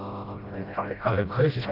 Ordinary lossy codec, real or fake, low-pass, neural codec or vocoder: Opus, 24 kbps; fake; 5.4 kHz; codec, 16 kHz, 0.5 kbps, FreqCodec, smaller model